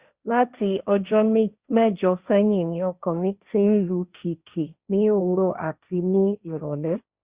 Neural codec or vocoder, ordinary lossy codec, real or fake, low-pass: codec, 16 kHz, 1.1 kbps, Voila-Tokenizer; Opus, 64 kbps; fake; 3.6 kHz